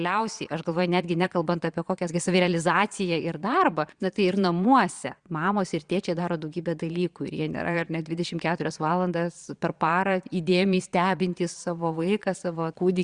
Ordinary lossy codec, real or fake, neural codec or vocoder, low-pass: Opus, 64 kbps; fake; vocoder, 22.05 kHz, 80 mel bands, Vocos; 9.9 kHz